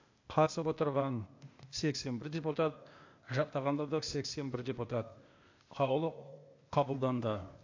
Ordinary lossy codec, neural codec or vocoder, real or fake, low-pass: AAC, 48 kbps; codec, 16 kHz, 0.8 kbps, ZipCodec; fake; 7.2 kHz